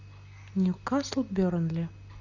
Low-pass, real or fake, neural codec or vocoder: 7.2 kHz; real; none